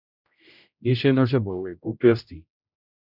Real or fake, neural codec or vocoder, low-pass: fake; codec, 16 kHz, 0.5 kbps, X-Codec, HuBERT features, trained on general audio; 5.4 kHz